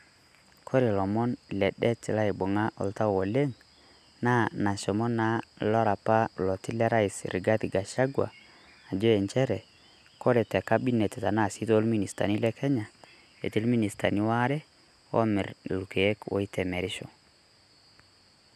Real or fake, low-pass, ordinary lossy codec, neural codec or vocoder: real; 14.4 kHz; none; none